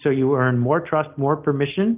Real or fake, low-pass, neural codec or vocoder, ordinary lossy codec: real; 3.6 kHz; none; Opus, 24 kbps